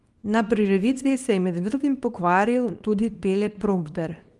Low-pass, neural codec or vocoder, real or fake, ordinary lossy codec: 10.8 kHz; codec, 24 kHz, 0.9 kbps, WavTokenizer, small release; fake; Opus, 32 kbps